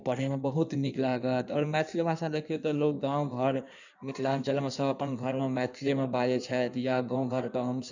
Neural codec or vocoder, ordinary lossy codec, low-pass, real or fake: codec, 16 kHz in and 24 kHz out, 1.1 kbps, FireRedTTS-2 codec; none; 7.2 kHz; fake